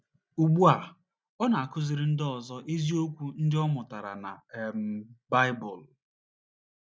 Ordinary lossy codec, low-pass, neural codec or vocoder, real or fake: none; none; none; real